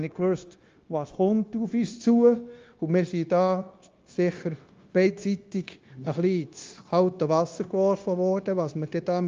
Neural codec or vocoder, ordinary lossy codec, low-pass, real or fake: codec, 16 kHz, 0.9 kbps, LongCat-Audio-Codec; Opus, 32 kbps; 7.2 kHz; fake